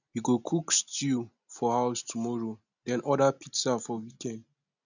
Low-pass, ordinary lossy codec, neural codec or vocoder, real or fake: 7.2 kHz; none; none; real